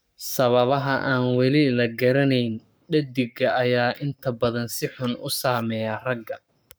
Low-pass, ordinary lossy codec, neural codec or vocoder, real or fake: none; none; codec, 44.1 kHz, 7.8 kbps, Pupu-Codec; fake